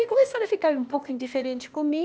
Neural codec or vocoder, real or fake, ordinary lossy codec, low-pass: codec, 16 kHz, 0.8 kbps, ZipCodec; fake; none; none